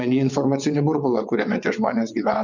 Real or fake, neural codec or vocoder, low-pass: fake; vocoder, 22.05 kHz, 80 mel bands, WaveNeXt; 7.2 kHz